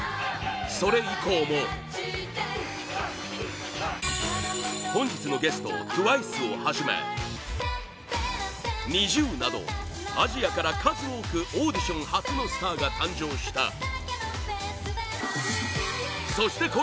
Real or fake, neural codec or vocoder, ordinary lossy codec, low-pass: real; none; none; none